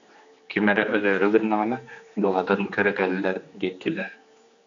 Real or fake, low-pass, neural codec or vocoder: fake; 7.2 kHz; codec, 16 kHz, 2 kbps, X-Codec, HuBERT features, trained on general audio